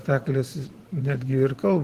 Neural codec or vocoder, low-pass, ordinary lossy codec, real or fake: vocoder, 44.1 kHz, 128 mel bands every 512 samples, BigVGAN v2; 14.4 kHz; Opus, 16 kbps; fake